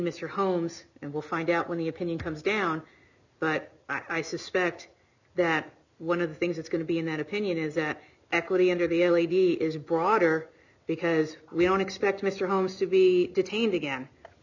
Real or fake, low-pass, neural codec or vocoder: real; 7.2 kHz; none